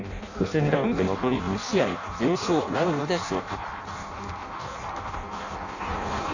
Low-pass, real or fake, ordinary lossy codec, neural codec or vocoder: 7.2 kHz; fake; none; codec, 16 kHz in and 24 kHz out, 0.6 kbps, FireRedTTS-2 codec